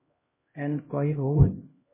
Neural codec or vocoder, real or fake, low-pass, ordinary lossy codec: codec, 16 kHz, 0.5 kbps, X-Codec, HuBERT features, trained on LibriSpeech; fake; 3.6 kHz; MP3, 16 kbps